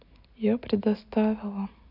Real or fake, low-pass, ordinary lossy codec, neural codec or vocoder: real; 5.4 kHz; none; none